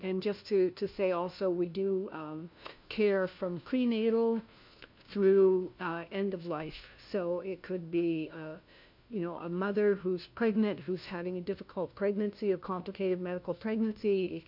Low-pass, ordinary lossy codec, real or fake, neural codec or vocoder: 5.4 kHz; MP3, 48 kbps; fake; codec, 16 kHz, 1 kbps, FunCodec, trained on LibriTTS, 50 frames a second